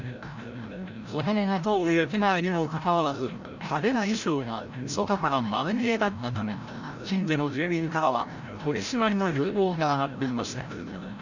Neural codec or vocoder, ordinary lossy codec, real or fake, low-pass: codec, 16 kHz, 0.5 kbps, FreqCodec, larger model; none; fake; 7.2 kHz